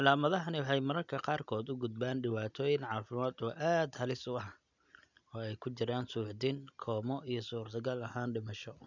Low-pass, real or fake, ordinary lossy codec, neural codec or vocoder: 7.2 kHz; real; none; none